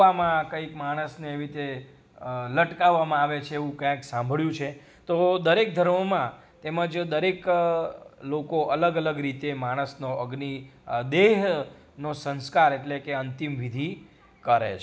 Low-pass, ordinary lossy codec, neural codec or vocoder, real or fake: none; none; none; real